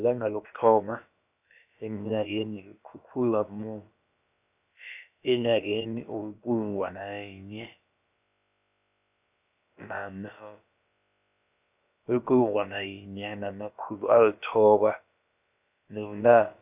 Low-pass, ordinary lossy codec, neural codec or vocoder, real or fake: 3.6 kHz; none; codec, 16 kHz, about 1 kbps, DyCAST, with the encoder's durations; fake